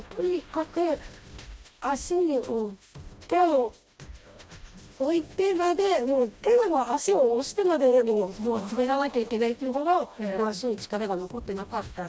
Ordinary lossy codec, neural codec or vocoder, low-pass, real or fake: none; codec, 16 kHz, 1 kbps, FreqCodec, smaller model; none; fake